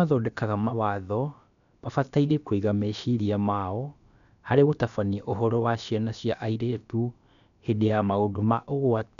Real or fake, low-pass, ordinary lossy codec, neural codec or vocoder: fake; 7.2 kHz; none; codec, 16 kHz, about 1 kbps, DyCAST, with the encoder's durations